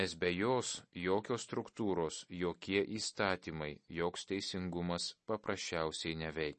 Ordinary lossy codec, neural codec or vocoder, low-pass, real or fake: MP3, 32 kbps; none; 10.8 kHz; real